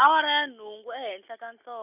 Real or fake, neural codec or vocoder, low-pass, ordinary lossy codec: real; none; 3.6 kHz; none